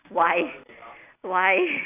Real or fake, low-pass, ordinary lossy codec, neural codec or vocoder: real; 3.6 kHz; none; none